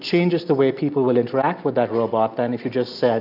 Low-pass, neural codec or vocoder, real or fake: 5.4 kHz; none; real